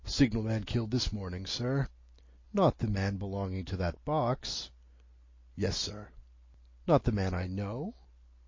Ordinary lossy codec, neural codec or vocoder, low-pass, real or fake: MP3, 32 kbps; none; 7.2 kHz; real